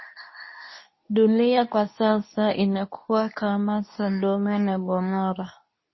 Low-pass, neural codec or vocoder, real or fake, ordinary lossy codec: 7.2 kHz; codec, 24 kHz, 0.9 kbps, WavTokenizer, medium speech release version 2; fake; MP3, 24 kbps